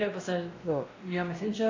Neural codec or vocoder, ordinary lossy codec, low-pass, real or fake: codec, 16 kHz, 1 kbps, X-Codec, WavLM features, trained on Multilingual LibriSpeech; AAC, 32 kbps; 7.2 kHz; fake